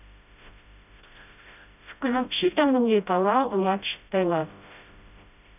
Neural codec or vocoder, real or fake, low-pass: codec, 16 kHz, 0.5 kbps, FreqCodec, smaller model; fake; 3.6 kHz